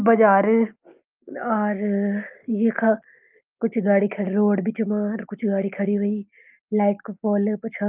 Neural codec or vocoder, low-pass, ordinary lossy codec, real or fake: none; 3.6 kHz; Opus, 32 kbps; real